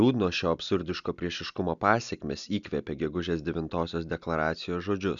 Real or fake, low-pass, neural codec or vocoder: real; 7.2 kHz; none